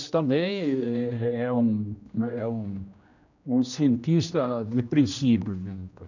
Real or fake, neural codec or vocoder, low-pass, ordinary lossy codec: fake; codec, 16 kHz, 1 kbps, X-Codec, HuBERT features, trained on general audio; 7.2 kHz; none